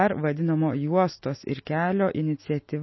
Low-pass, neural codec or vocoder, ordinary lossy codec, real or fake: 7.2 kHz; none; MP3, 24 kbps; real